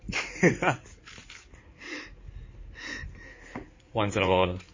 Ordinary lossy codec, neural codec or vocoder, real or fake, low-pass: MP3, 32 kbps; codec, 16 kHz in and 24 kHz out, 2.2 kbps, FireRedTTS-2 codec; fake; 7.2 kHz